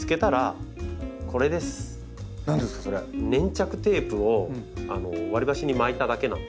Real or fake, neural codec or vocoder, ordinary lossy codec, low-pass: real; none; none; none